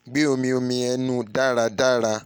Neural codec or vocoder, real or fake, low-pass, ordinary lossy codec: none; real; none; none